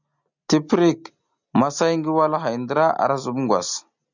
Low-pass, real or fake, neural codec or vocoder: 7.2 kHz; real; none